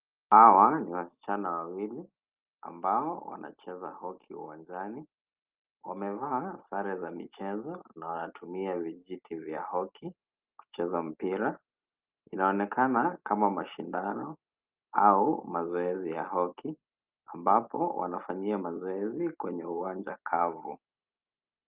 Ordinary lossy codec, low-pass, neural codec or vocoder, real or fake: Opus, 32 kbps; 3.6 kHz; none; real